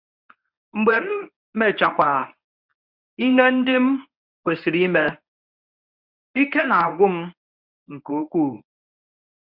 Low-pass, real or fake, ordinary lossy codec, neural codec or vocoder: 5.4 kHz; fake; none; codec, 24 kHz, 0.9 kbps, WavTokenizer, medium speech release version 1